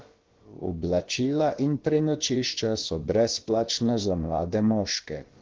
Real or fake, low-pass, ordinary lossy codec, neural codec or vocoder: fake; 7.2 kHz; Opus, 16 kbps; codec, 16 kHz, about 1 kbps, DyCAST, with the encoder's durations